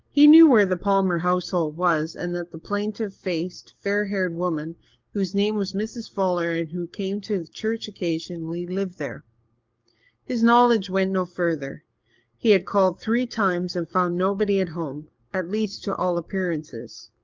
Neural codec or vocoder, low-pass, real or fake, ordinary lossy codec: codec, 44.1 kHz, 7.8 kbps, DAC; 7.2 kHz; fake; Opus, 24 kbps